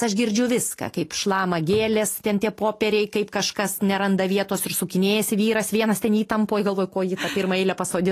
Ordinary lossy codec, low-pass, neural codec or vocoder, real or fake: AAC, 48 kbps; 14.4 kHz; none; real